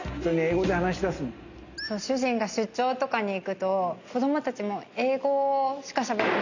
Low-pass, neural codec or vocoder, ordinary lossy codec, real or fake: 7.2 kHz; none; none; real